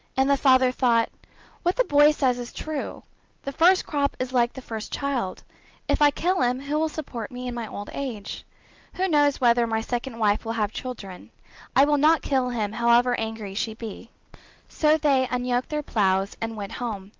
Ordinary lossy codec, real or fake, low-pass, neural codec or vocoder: Opus, 24 kbps; real; 7.2 kHz; none